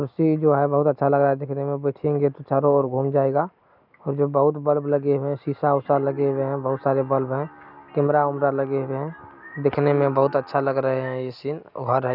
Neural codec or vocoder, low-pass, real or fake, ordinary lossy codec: none; 5.4 kHz; real; none